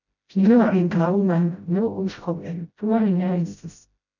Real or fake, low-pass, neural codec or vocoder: fake; 7.2 kHz; codec, 16 kHz, 0.5 kbps, FreqCodec, smaller model